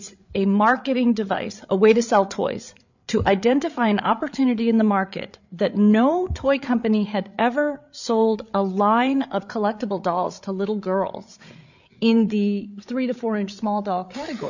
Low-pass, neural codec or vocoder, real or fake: 7.2 kHz; codec, 16 kHz, 8 kbps, FreqCodec, larger model; fake